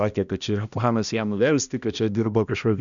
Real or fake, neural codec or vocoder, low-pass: fake; codec, 16 kHz, 1 kbps, X-Codec, HuBERT features, trained on balanced general audio; 7.2 kHz